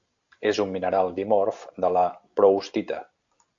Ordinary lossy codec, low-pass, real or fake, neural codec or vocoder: AAC, 48 kbps; 7.2 kHz; real; none